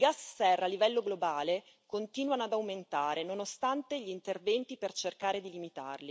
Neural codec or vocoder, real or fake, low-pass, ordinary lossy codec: none; real; none; none